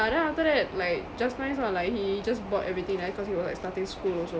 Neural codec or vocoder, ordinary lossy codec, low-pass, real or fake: none; none; none; real